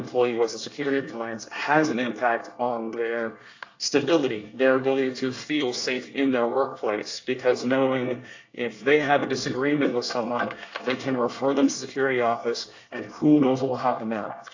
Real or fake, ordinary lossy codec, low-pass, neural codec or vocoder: fake; AAC, 48 kbps; 7.2 kHz; codec, 24 kHz, 1 kbps, SNAC